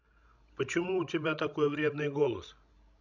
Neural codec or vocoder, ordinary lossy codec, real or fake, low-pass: codec, 16 kHz, 16 kbps, FreqCodec, larger model; none; fake; 7.2 kHz